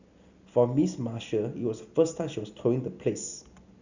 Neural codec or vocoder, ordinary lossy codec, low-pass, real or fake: none; Opus, 64 kbps; 7.2 kHz; real